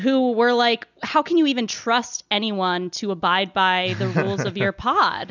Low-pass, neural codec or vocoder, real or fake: 7.2 kHz; none; real